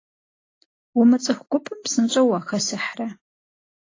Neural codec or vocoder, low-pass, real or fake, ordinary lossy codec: none; 7.2 kHz; real; AAC, 32 kbps